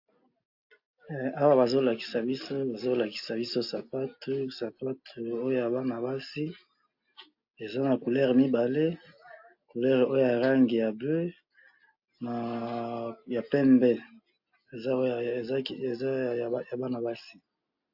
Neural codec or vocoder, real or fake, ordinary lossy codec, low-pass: none; real; AAC, 48 kbps; 5.4 kHz